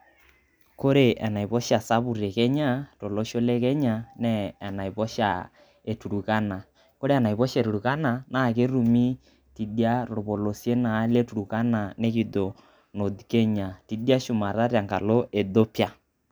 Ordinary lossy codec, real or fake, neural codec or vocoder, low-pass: none; real; none; none